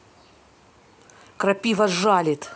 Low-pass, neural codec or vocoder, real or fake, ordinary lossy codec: none; none; real; none